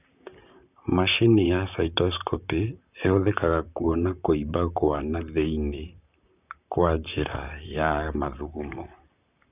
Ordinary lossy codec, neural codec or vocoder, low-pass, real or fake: none; vocoder, 44.1 kHz, 128 mel bands, Pupu-Vocoder; 3.6 kHz; fake